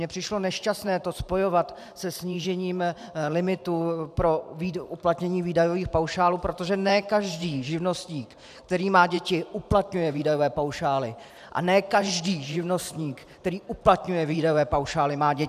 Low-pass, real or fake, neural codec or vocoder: 14.4 kHz; fake; vocoder, 44.1 kHz, 128 mel bands every 512 samples, BigVGAN v2